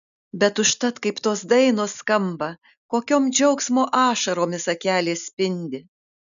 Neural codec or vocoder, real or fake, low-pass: none; real; 7.2 kHz